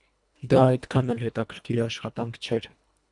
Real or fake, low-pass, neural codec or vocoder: fake; 10.8 kHz; codec, 24 kHz, 1.5 kbps, HILCodec